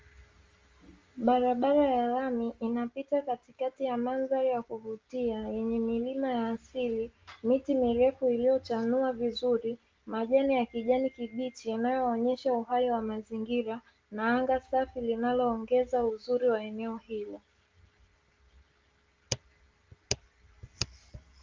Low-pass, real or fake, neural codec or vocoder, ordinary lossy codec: 7.2 kHz; real; none; Opus, 32 kbps